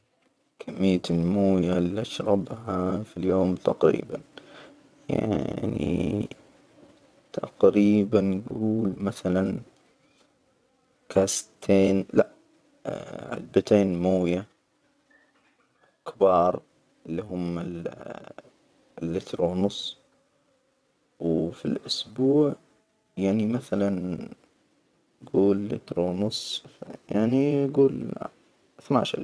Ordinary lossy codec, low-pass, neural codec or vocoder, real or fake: none; none; vocoder, 22.05 kHz, 80 mel bands, WaveNeXt; fake